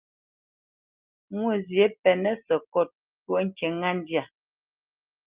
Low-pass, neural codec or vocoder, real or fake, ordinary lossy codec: 3.6 kHz; none; real; Opus, 32 kbps